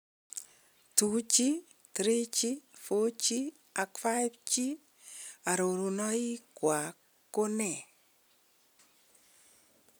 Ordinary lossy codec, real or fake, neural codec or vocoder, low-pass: none; real; none; none